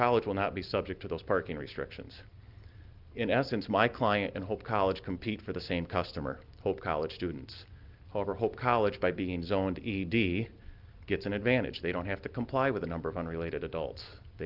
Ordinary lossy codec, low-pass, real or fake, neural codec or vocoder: Opus, 32 kbps; 5.4 kHz; real; none